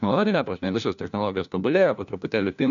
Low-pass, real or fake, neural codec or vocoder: 7.2 kHz; fake; codec, 16 kHz, 1 kbps, FunCodec, trained on LibriTTS, 50 frames a second